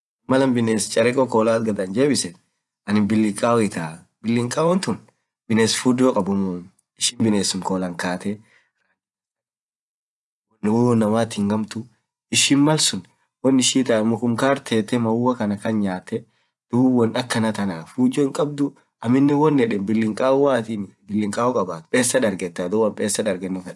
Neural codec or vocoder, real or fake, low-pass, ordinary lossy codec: vocoder, 24 kHz, 100 mel bands, Vocos; fake; none; none